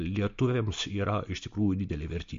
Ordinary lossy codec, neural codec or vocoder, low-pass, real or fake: MP3, 48 kbps; none; 7.2 kHz; real